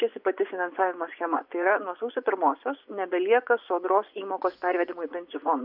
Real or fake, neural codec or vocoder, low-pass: fake; vocoder, 24 kHz, 100 mel bands, Vocos; 5.4 kHz